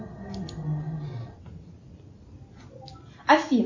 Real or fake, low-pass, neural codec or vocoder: real; 7.2 kHz; none